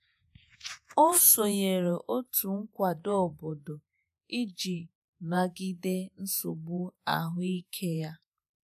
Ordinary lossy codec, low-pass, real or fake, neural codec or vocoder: none; 14.4 kHz; fake; vocoder, 48 kHz, 128 mel bands, Vocos